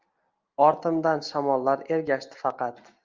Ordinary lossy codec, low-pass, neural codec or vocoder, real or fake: Opus, 32 kbps; 7.2 kHz; none; real